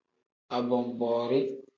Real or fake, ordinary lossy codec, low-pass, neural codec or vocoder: real; MP3, 64 kbps; 7.2 kHz; none